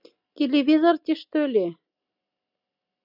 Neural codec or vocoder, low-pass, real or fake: none; 5.4 kHz; real